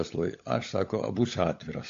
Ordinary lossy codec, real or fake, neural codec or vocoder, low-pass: AAC, 48 kbps; fake; codec, 16 kHz, 16 kbps, FunCodec, trained on LibriTTS, 50 frames a second; 7.2 kHz